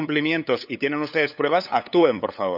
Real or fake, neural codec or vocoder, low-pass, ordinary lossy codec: fake; codec, 16 kHz, 8 kbps, FunCodec, trained on LibriTTS, 25 frames a second; 5.4 kHz; none